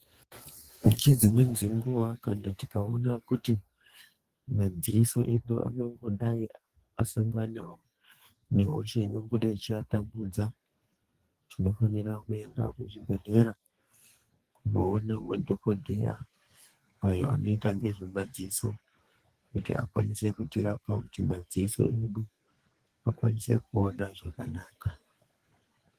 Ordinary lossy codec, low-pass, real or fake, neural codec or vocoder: Opus, 24 kbps; 14.4 kHz; fake; codec, 32 kHz, 1.9 kbps, SNAC